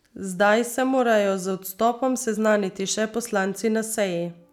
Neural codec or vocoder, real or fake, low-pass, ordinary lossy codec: none; real; 19.8 kHz; none